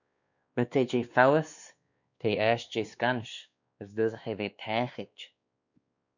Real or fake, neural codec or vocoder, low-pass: fake; codec, 16 kHz, 2 kbps, X-Codec, WavLM features, trained on Multilingual LibriSpeech; 7.2 kHz